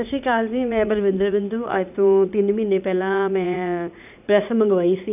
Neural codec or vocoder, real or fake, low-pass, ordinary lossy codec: vocoder, 44.1 kHz, 80 mel bands, Vocos; fake; 3.6 kHz; none